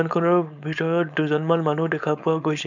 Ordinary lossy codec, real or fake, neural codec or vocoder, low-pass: none; fake; codec, 16 kHz, 4.8 kbps, FACodec; 7.2 kHz